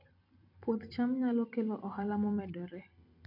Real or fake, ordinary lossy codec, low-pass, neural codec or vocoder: fake; none; 5.4 kHz; vocoder, 44.1 kHz, 128 mel bands every 256 samples, BigVGAN v2